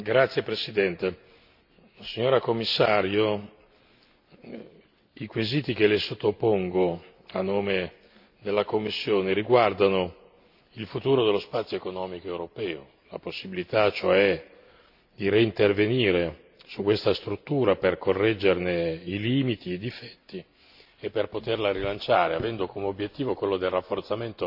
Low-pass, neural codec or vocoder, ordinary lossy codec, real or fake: 5.4 kHz; none; AAC, 48 kbps; real